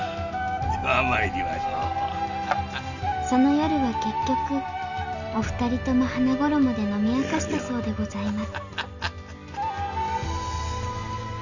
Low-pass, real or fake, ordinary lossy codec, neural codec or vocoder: 7.2 kHz; real; none; none